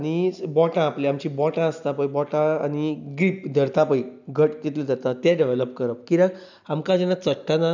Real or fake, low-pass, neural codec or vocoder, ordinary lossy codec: real; 7.2 kHz; none; none